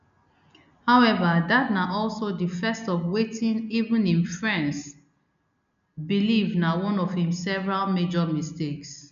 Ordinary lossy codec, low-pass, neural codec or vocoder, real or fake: none; 7.2 kHz; none; real